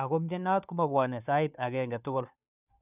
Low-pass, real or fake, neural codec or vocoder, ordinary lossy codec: 3.6 kHz; fake; codec, 16 kHz, 2 kbps, FunCodec, trained on Chinese and English, 25 frames a second; none